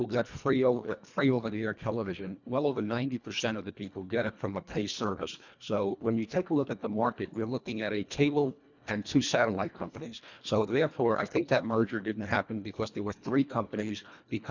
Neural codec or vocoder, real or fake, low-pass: codec, 24 kHz, 1.5 kbps, HILCodec; fake; 7.2 kHz